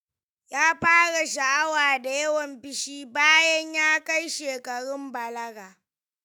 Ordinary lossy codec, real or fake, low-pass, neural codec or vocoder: none; fake; none; autoencoder, 48 kHz, 128 numbers a frame, DAC-VAE, trained on Japanese speech